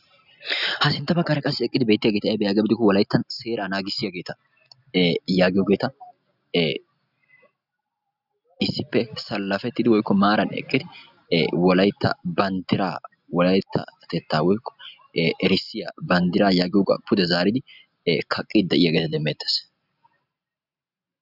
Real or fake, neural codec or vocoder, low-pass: real; none; 5.4 kHz